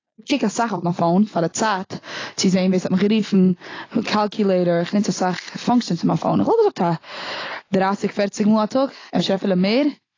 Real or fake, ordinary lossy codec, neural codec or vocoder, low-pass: real; AAC, 32 kbps; none; 7.2 kHz